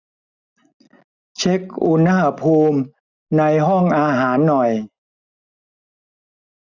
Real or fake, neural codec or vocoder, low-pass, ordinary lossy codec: real; none; 7.2 kHz; none